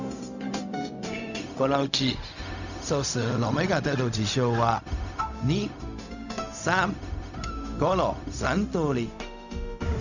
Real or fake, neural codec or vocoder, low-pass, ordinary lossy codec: fake; codec, 16 kHz, 0.4 kbps, LongCat-Audio-Codec; 7.2 kHz; none